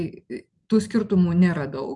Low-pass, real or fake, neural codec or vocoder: 10.8 kHz; real; none